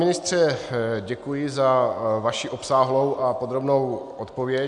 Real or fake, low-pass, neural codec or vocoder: real; 10.8 kHz; none